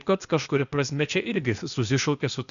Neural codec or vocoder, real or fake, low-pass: codec, 16 kHz, 0.8 kbps, ZipCodec; fake; 7.2 kHz